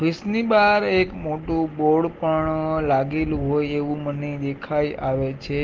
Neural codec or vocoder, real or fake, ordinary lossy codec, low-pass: none; real; Opus, 16 kbps; 7.2 kHz